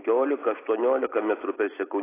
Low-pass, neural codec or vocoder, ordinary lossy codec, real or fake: 3.6 kHz; none; AAC, 16 kbps; real